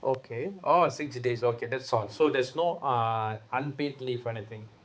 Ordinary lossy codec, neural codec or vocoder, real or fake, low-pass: none; codec, 16 kHz, 4 kbps, X-Codec, HuBERT features, trained on balanced general audio; fake; none